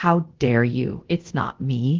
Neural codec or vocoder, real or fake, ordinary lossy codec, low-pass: codec, 24 kHz, 0.5 kbps, DualCodec; fake; Opus, 16 kbps; 7.2 kHz